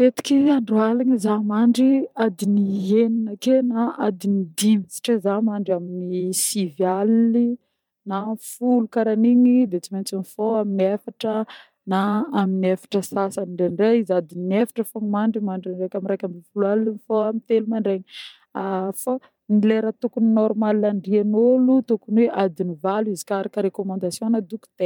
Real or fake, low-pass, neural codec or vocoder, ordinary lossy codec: fake; 19.8 kHz; vocoder, 44.1 kHz, 128 mel bands every 512 samples, BigVGAN v2; MP3, 96 kbps